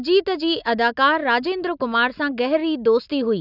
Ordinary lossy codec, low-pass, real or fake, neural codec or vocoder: none; 5.4 kHz; real; none